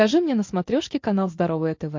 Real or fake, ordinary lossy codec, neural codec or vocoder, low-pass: real; MP3, 48 kbps; none; 7.2 kHz